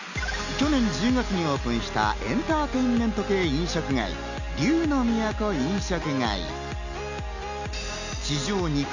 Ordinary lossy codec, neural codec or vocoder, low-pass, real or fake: none; none; 7.2 kHz; real